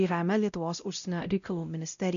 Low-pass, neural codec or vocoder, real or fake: 7.2 kHz; codec, 16 kHz, 0.5 kbps, X-Codec, WavLM features, trained on Multilingual LibriSpeech; fake